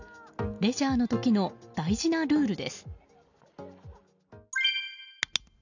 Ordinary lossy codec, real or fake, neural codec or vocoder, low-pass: none; real; none; 7.2 kHz